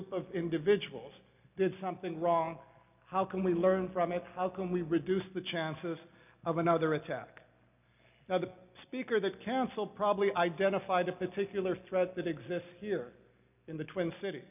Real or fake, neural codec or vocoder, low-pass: real; none; 3.6 kHz